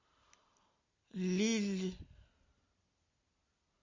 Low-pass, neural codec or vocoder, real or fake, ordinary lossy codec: 7.2 kHz; none; real; MP3, 48 kbps